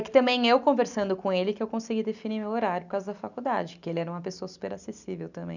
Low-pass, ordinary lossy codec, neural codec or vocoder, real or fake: 7.2 kHz; none; none; real